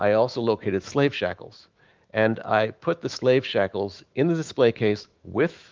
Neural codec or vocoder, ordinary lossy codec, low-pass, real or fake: autoencoder, 48 kHz, 128 numbers a frame, DAC-VAE, trained on Japanese speech; Opus, 24 kbps; 7.2 kHz; fake